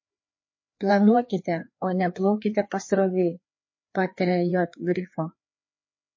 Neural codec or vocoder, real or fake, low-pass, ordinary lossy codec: codec, 16 kHz, 2 kbps, FreqCodec, larger model; fake; 7.2 kHz; MP3, 32 kbps